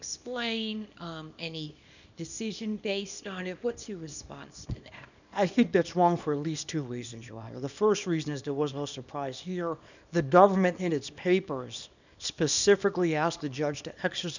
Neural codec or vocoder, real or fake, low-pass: codec, 24 kHz, 0.9 kbps, WavTokenizer, small release; fake; 7.2 kHz